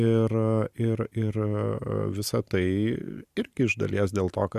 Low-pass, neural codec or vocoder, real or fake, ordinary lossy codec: 14.4 kHz; vocoder, 44.1 kHz, 128 mel bands every 512 samples, BigVGAN v2; fake; Opus, 64 kbps